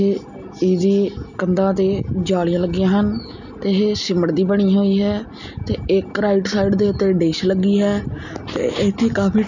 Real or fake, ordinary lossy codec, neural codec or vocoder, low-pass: real; none; none; 7.2 kHz